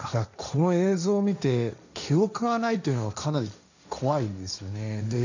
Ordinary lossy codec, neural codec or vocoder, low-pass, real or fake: none; codec, 16 kHz, 1.1 kbps, Voila-Tokenizer; 7.2 kHz; fake